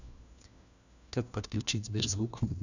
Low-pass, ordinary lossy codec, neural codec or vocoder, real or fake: 7.2 kHz; none; codec, 16 kHz, 1 kbps, FunCodec, trained on LibriTTS, 50 frames a second; fake